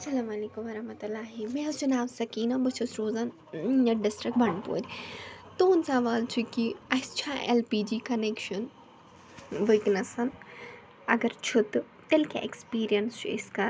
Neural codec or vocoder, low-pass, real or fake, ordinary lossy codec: none; none; real; none